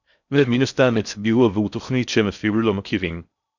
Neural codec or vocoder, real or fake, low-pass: codec, 16 kHz in and 24 kHz out, 0.6 kbps, FocalCodec, streaming, 4096 codes; fake; 7.2 kHz